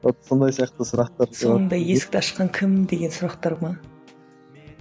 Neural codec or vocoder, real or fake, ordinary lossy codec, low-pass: none; real; none; none